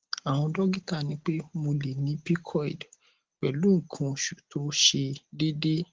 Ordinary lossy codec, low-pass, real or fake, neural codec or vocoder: Opus, 16 kbps; 7.2 kHz; real; none